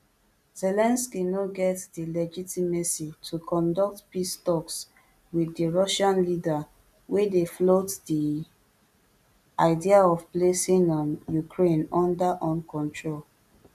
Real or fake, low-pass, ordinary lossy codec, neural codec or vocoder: real; 14.4 kHz; none; none